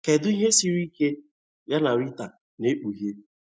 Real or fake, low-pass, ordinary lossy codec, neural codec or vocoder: real; none; none; none